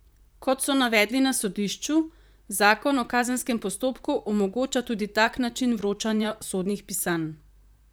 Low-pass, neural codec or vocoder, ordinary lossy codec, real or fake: none; vocoder, 44.1 kHz, 128 mel bands, Pupu-Vocoder; none; fake